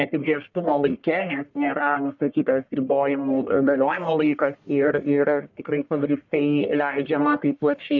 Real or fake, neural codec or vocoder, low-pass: fake; codec, 44.1 kHz, 1.7 kbps, Pupu-Codec; 7.2 kHz